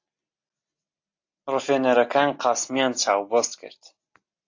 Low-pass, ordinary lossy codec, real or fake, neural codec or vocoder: 7.2 kHz; AAC, 48 kbps; real; none